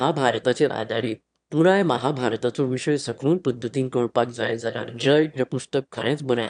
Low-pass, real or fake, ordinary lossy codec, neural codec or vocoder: 9.9 kHz; fake; none; autoencoder, 22.05 kHz, a latent of 192 numbers a frame, VITS, trained on one speaker